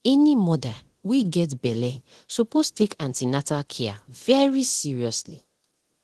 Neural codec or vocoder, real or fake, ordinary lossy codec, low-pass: codec, 24 kHz, 0.9 kbps, DualCodec; fake; Opus, 16 kbps; 10.8 kHz